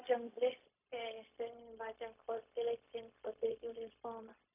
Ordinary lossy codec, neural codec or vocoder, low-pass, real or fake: none; codec, 16 kHz, 0.4 kbps, LongCat-Audio-Codec; 3.6 kHz; fake